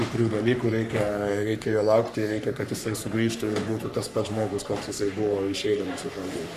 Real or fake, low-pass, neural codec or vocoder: fake; 14.4 kHz; codec, 44.1 kHz, 3.4 kbps, Pupu-Codec